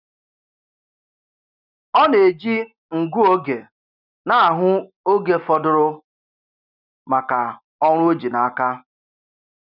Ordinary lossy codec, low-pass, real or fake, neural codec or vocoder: none; 5.4 kHz; real; none